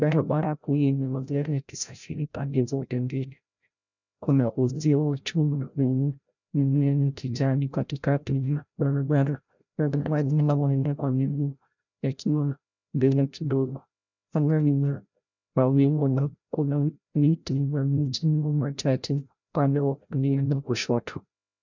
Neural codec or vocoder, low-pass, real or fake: codec, 16 kHz, 0.5 kbps, FreqCodec, larger model; 7.2 kHz; fake